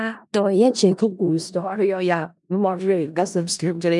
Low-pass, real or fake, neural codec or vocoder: 10.8 kHz; fake; codec, 16 kHz in and 24 kHz out, 0.4 kbps, LongCat-Audio-Codec, four codebook decoder